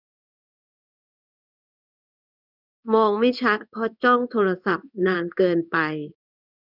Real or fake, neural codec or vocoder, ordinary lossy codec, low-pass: fake; codec, 16 kHz in and 24 kHz out, 1 kbps, XY-Tokenizer; none; 5.4 kHz